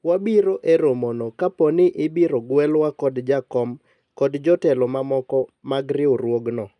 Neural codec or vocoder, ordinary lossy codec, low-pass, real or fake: none; none; 10.8 kHz; real